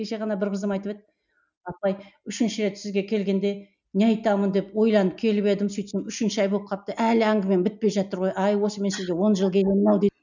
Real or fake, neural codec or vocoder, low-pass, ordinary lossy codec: real; none; 7.2 kHz; none